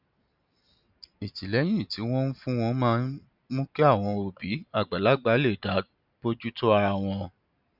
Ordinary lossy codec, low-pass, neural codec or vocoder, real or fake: none; 5.4 kHz; none; real